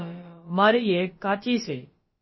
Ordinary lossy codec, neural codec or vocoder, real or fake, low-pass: MP3, 24 kbps; codec, 16 kHz, about 1 kbps, DyCAST, with the encoder's durations; fake; 7.2 kHz